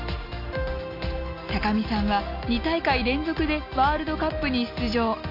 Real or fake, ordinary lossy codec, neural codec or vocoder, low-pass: real; AAC, 32 kbps; none; 5.4 kHz